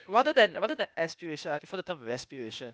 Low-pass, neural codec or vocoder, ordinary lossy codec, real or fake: none; codec, 16 kHz, 0.8 kbps, ZipCodec; none; fake